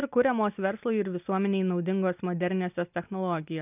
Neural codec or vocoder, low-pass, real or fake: none; 3.6 kHz; real